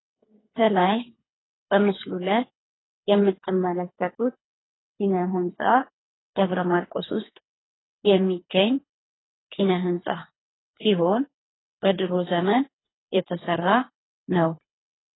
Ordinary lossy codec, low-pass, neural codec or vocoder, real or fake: AAC, 16 kbps; 7.2 kHz; codec, 24 kHz, 3 kbps, HILCodec; fake